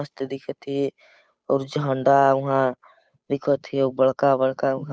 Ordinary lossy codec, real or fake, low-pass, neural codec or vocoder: none; fake; none; codec, 16 kHz, 8 kbps, FunCodec, trained on Chinese and English, 25 frames a second